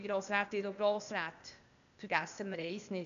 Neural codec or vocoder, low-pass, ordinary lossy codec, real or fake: codec, 16 kHz, 0.8 kbps, ZipCodec; 7.2 kHz; none; fake